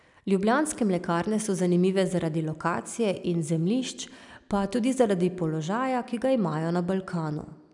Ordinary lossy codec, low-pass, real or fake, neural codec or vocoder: none; 10.8 kHz; real; none